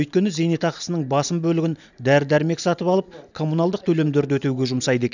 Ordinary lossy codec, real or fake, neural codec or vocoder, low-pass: none; real; none; 7.2 kHz